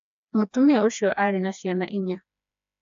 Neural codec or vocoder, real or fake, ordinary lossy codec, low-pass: codec, 16 kHz, 2 kbps, FreqCodec, smaller model; fake; AAC, 96 kbps; 7.2 kHz